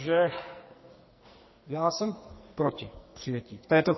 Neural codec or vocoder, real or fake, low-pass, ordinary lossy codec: codec, 44.1 kHz, 2.6 kbps, SNAC; fake; 7.2 kHz; MP3, 24 kbps